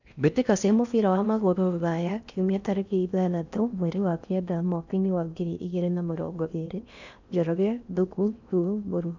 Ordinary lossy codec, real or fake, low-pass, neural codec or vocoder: none; fake; 7.2 kHz; codec, 16 kHz in and 24 kHz out, 0.6 kbps, FocalCodec, streaming, 4096 codes